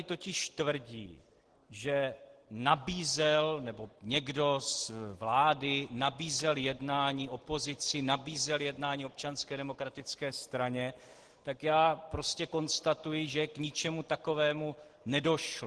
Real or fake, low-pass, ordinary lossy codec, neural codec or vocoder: real; 10.8 kHz; Opus, 16 kbps; none